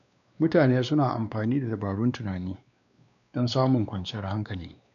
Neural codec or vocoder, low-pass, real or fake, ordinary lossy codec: codec, 16 kHz, 2 kbps, X-Codec, WavLM features, trained on Multilingual LibriSpeech; 7.2 kHz; fake; none